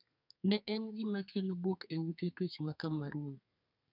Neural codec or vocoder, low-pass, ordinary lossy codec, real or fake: codec, 32 kHz, 1.9 kbps, SNAC; 5.4 kHz; none; fake